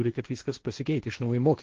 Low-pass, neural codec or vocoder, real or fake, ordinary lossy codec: 7.2 kHz; codec, 16 kHz, 1.1 kbps, Voila-Tokenizer; fake; Opus, 16 kbps